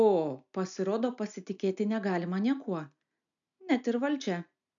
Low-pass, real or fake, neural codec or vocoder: 7.2 kHz; real; none